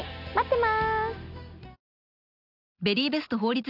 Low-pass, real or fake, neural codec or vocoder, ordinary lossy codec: 5.4 kHz; real; none; none